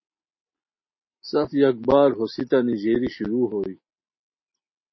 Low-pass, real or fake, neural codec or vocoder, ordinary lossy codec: 7.2 kHz; real; none; MP3, 24 kbps